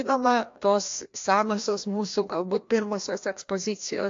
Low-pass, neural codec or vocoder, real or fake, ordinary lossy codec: 7.2 kHz; codec, 16 kHz, 1 kbps, FreqCodec, larger model; fake; AAC, 48 kbps